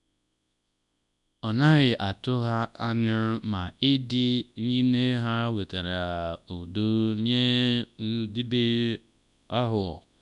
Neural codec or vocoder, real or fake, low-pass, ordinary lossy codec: codec, 24 kHz, 0.9 kbps, WavTokenizer, large speech release; fake; 10.8 kHz; none